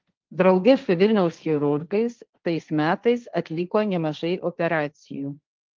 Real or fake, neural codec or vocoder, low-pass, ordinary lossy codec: fake; codec, 16 kHz, 1.1 kbps, Voila-Tokenizer; 7.2 kHz; Opus, 32 kbps